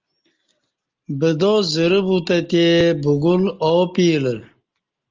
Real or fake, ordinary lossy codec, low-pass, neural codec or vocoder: real; Opus, 24 kbps; 7.2 kHz; none